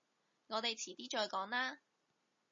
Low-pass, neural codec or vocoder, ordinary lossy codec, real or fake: 7.2 kHz; none; MP3, 32 kbps; real